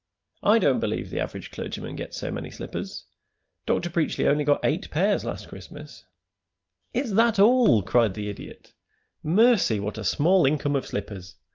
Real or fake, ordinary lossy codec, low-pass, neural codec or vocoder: real; Opus, 24 kbps; 7.2 kHz; none